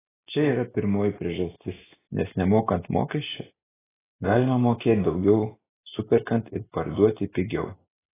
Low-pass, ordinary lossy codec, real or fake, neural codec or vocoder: 3.6 kHz; AAC, 16 kbps; real; none